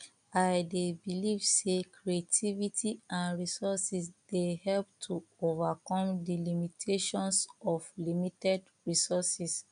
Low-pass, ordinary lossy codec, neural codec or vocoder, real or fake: 9.9 kHz; none; none; real